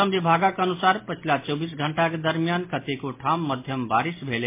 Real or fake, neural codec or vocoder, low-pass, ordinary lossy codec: real; none; 3.6 kHz; MP3, 24 kbps